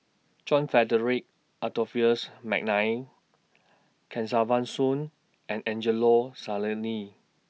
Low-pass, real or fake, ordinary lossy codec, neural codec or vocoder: none; real; none; none